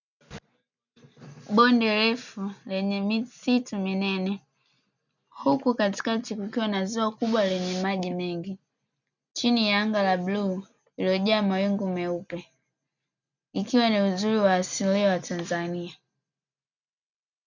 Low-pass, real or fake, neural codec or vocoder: 7.2 kHz; real; none